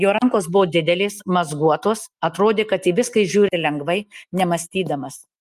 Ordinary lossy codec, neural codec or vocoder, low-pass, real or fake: Opus, 32 kbps; none; 14.4 kHz; real